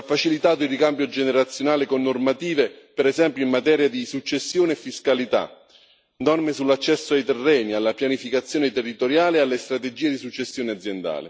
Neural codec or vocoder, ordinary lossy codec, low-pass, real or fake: none; none; none; real